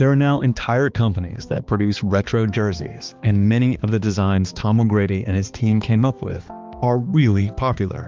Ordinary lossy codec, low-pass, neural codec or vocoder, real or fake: Opus, 24 kbps; 7.2 kHz; codec, 16 kHz, 2 kbps, X-Codec, HuBERT features, trained on balanced general audio; fake